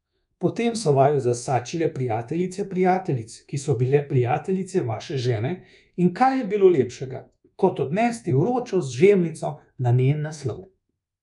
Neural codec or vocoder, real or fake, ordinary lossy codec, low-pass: codec, 24 kHz, 1.2 kbps, DualCodec; fake; none; 10.8 kHz